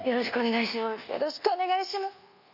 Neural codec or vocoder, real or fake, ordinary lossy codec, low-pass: codec, 24 kHz, 1.2 kbps, DualCodec; fake; none; 5.4 kHz